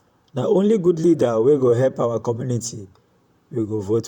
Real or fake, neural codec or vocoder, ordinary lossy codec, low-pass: fake; vocoder, 44.1 kHz, 128 mel bands, Pupu-Vocoder; none; 19.8 kHz